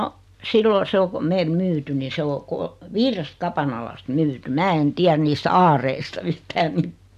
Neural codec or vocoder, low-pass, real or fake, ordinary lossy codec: none; 14.4 kHz; real; AAC, 96 kbps